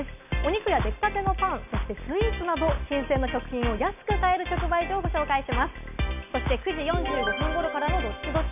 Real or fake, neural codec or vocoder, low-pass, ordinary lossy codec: real; none; 3.6 kHz; none